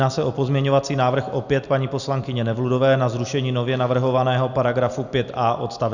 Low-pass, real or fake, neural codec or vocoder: 7.2 kHz; real; none